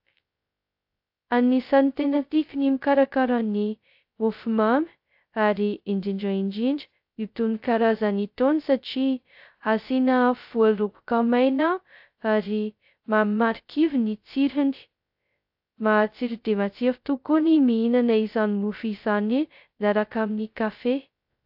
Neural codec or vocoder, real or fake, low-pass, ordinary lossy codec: codec, 16 kHz, 0.2 kbps, FocalCodec; fake; 5.4 kHz; AAC, 48 kbps